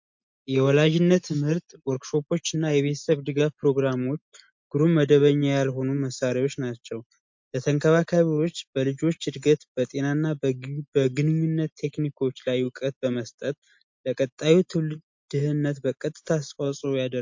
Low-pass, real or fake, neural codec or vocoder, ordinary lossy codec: 7.2 kHz; real; none; MP3, 48 kbps